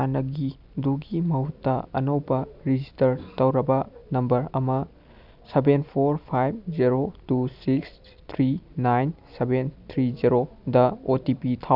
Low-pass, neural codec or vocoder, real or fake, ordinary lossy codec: 5.4 kHz; none; real; none